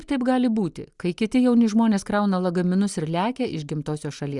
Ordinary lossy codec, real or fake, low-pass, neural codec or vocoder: Opus, 64 kbps; real; 10.8 kHz; none